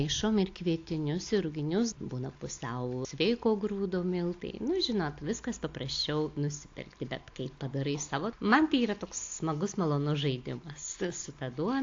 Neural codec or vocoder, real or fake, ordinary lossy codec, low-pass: none; real; AAC, 48 kbps; 7.2 kHz